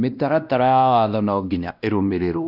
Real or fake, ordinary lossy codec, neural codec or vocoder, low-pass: fake; none; codec, 16 kHz, 1 kbps, X-Codec, WavLM features, trained on Multilingual LibriSpeech; 5.4 kHz